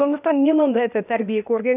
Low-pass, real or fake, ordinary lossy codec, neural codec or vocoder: 3.6 kHz; fake; none; codec, 16 kHz, 0.8 kbps, ZipCodec